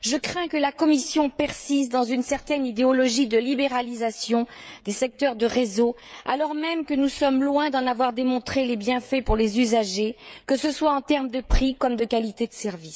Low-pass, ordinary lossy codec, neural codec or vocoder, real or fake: none; none; codec, 16 kHz, 16 kbps, FreqCodec, smaller model; fake